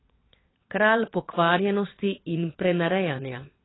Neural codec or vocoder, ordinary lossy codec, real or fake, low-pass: vocoder, 22.05 kHz, 80 mel bands, WaveNeXt; AAC, 16 kbps; fake; 7.2 kHz